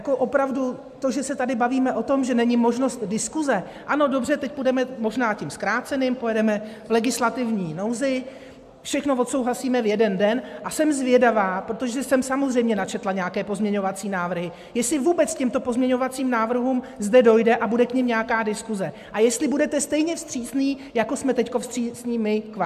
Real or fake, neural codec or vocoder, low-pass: real; none; 14.4 kHz